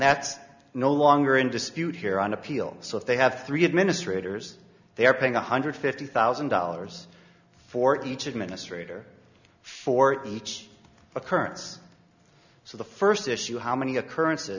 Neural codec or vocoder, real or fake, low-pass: none; real; 7.2 kHz